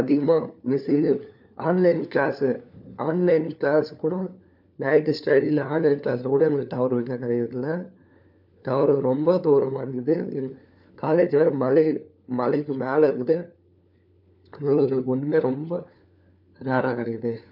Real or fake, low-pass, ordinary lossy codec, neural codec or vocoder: fake; 5.4 kHz; none; codec, 16 kHz, 4 kbps, FunCodec, trained on LibriTTS, 50 frames a second